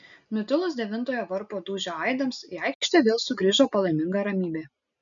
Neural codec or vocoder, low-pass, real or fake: none; 7.2 kHz; real